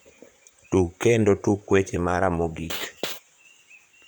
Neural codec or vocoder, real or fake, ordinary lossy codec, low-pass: vocoder, 44.1 kHz, 128 mel bands, Pupu-Vocoder; fake; none; none